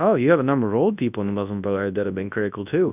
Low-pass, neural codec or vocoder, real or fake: 3.6 kHz; codec, 24 kHz, 0.9 kbps, WavTokenizer, large speech release; fake